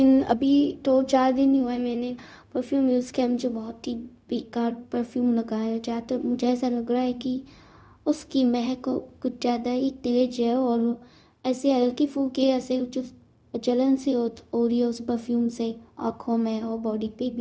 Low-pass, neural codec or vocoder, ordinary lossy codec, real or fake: none; codec, 16 kHz, 0.4 kbps, LongCat-Audio-Codec; none; fake